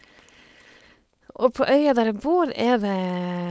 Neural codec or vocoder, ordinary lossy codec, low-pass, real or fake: codec, 16 kHz, 4.8 kbps, FACodec; none; none; fake